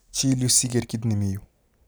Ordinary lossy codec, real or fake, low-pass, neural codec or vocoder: none; real; none; none